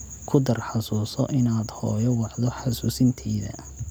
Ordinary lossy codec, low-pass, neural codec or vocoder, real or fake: none; none; none; real